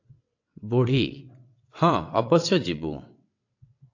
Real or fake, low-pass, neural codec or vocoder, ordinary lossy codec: fake; 7.2 kHz; vocoder, 22.05 kHz, 80 mel bands, WaveNeXt; AAC, 48 kbps